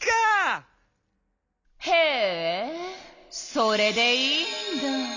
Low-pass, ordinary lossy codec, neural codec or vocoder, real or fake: 7.2 kHz; none; none; real